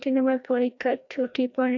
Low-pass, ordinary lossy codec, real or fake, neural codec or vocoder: 7.2 kHz; none; fake; codec, 16 kHz, 1 kbps, FreqCodec, larger model